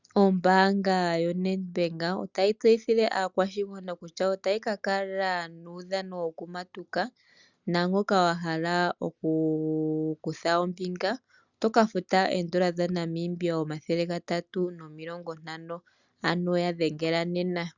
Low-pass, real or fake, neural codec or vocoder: 7.2 kHz; real; none